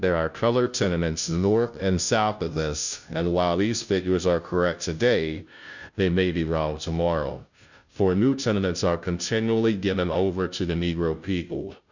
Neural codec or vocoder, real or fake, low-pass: codec, 16 kHz, 0.5 kbps, FunCodec, trained on Chinese and English, 25 frames a second; fake; 7.2 kHz